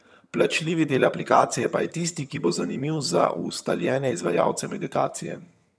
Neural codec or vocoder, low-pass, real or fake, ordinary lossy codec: vocoder, 22.05 kHz, 80 mel bands, HiFi-GAN; none; fake; none